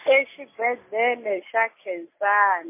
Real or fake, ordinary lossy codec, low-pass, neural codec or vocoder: real; MP3, 24 kbps; 3.6 kHz; none